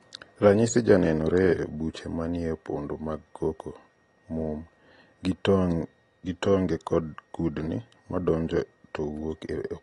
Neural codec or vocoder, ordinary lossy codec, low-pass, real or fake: none; AAC, 32 kbps; 10.8 kHz; real